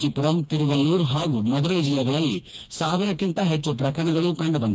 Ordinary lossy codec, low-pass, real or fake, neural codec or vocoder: none; none; fake; codec, 16 kHz, 2 kbps, FreqCodec, smaller model